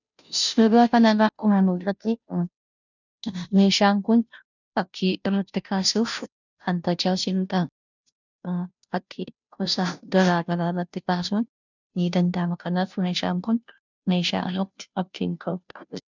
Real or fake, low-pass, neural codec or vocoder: fake; 7.2 kHz; codec, 16 kHz, 0.5 kbps, FunCodec, trained on Chinese and English, 25 frames a second